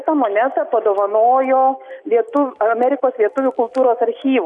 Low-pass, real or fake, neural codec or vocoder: 10.8 kHz; real; none